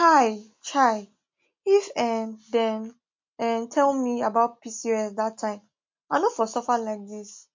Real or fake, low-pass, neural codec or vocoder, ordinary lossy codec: real; 7.2 kHz; none; MP3, 48 kbps